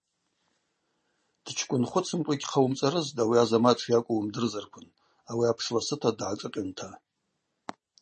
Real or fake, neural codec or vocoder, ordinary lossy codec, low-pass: real; none; MP3, 32 kbps; 10.8 kHz